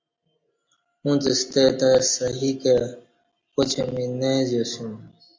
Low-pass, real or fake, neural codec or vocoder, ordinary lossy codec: 7.2 kHz; real; none; MP3, 48 kbps